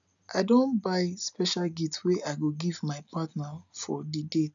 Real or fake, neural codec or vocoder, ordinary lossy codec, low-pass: real; none; none; 7.2 kHz